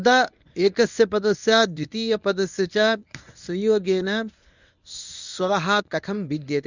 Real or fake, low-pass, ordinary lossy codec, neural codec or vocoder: fake; 7.2 kHz; none; codec, 24 kHz, 0.9 kbps, WavTokenizer, medium speech release version 1